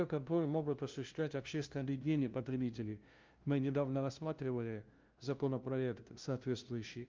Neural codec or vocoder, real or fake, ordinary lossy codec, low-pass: codec, 16 kHz, 0.5 kbps, FunCodec, trained on LibriTTS, 25 frames a second; fake; Opus, 32 kbps; 7.2 kHz